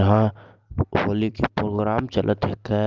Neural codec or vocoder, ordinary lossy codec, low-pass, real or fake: none; Opus, 24 kbps; 7.2 kHz; real